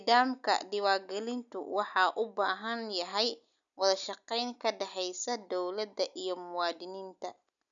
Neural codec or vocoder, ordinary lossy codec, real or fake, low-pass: none; none; real; 7.2 kHz